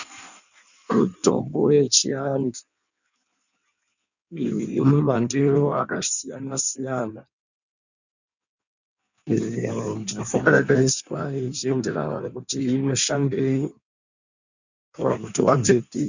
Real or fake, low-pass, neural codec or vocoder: fake; 7.2 kHz; codec, 16 kHz in and 24 kHz out, 1.1 kbps, FireRedTTS-2 codec